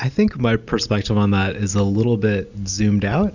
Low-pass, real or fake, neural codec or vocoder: 7.2 kHz; real; none